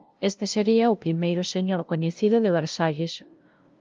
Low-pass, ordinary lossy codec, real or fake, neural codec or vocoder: 7.2 kHz; Opus, 32 kbps; fake; codec, 16 kHz, 0.5 kbps, FunCodec, trained on LibriTTS, 25 frames a second